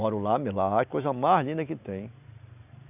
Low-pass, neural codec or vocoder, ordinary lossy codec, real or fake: 3.6 kHz; none; none; real